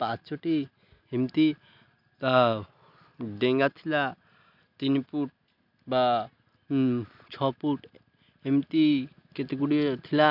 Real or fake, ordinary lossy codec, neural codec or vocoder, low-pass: real; none; none; 5.4 kHz